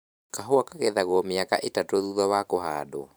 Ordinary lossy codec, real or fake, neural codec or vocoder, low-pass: none; fake; vocoder, 44.1 kHz, 128 mel bands every 512 samples, BigVGAN v2; none